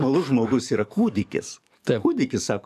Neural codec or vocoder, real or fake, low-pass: codec, 44.1 kHz, 7.8 kbps, DAC; fake; 14.4 kHz